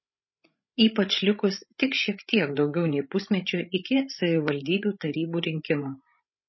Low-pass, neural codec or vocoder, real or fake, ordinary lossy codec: 7.2 kHz; codec, 16 kHz, 16 kbps, FreqCodec, larger model; fake; MP3, 24 kbps